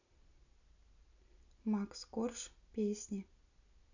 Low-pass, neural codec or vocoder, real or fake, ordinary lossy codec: 7.2 kHz; none; real; none